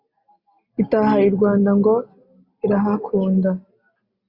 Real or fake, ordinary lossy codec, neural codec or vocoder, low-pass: real; Opus, 64 kbps; none; 5.4 kHz